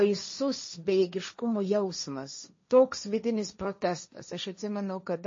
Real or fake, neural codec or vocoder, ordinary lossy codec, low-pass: fake; codec, 16 kHz, 1.1 kbps, Voila-Tokenizer; MP3, 32 kbps; 7.2 kHz